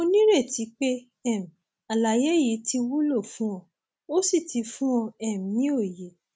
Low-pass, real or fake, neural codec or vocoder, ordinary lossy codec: none; real; none; none